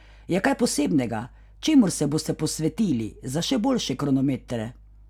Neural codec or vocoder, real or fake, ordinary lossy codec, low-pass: none; real; none; 19.8 kHz